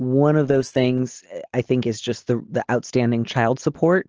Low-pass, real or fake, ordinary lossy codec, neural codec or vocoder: 7.2 kHz; real; Opus, 16 kbps; none